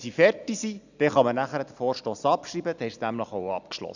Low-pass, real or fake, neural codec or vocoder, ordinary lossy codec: 7.2 kHz; real; none; none